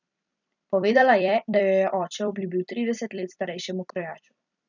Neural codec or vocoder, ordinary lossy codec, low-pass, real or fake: vocoder, 44.1 kHz, 128 mel bands every 256 samples, BigVGAN v2; none; 7.2 kHz; fake